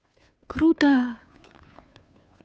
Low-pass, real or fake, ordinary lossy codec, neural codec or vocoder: none; fake; none; codec, 16 kHz, 2 kbps, FunCodec, trained on Chinese and English, 25 frames a second